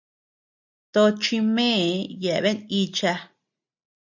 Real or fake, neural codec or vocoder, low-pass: real; none; 7.2 kHz